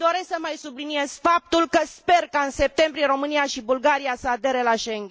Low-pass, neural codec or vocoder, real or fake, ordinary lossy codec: none; none; real; none